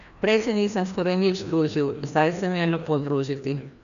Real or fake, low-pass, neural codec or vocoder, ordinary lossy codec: fake; 7.2 kHz; codec, 16 kHz, 1 kbps, FreqCodec, larger model; none